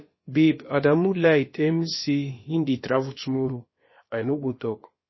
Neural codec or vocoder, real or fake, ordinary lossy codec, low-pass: codec, 16 kHz, about 1 kbps, DyCAST, with the encoder's durations; fake; MP3, 24 kbps; 7.2 kHz